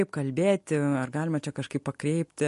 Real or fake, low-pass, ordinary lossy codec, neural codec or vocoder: real; 14.4 kHz; MP3, 48 kbps; none